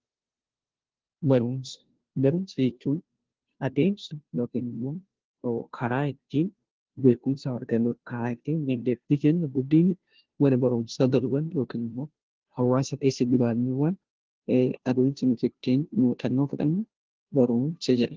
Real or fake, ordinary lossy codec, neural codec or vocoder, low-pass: fake; Opus, 16 kbps; codec, 16 kHz, 0.5 kbps, FunCodec, trained on Chinese and English, 25 frames a second; 7.2 kHz